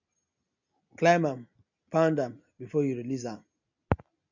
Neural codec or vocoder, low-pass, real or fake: none; 7.2 kHz; real